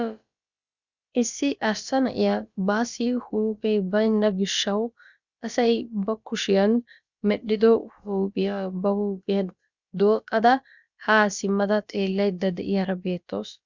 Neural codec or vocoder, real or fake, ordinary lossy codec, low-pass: codec, 16 kHz, about 1 kbps, DyCAST, with the encoder's durations; fake; Opus, 64 kbps; 7.2 kHz